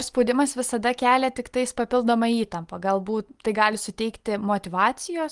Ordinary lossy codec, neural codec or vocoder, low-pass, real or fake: Opus, 24 kbps; none; 10.8 kHz; real